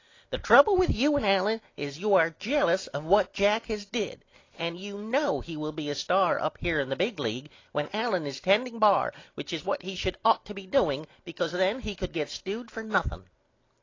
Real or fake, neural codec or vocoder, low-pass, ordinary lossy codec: real; none; 7.2 kHz; AAC, 32 kbps